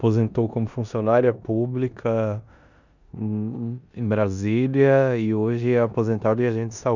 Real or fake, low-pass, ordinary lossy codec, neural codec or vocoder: fake; 7.2 kHz; none; codec, 16 kHz in and 24 kHz out, 0.9 kbps, LongCat-Audio-Codec, four codebook decoder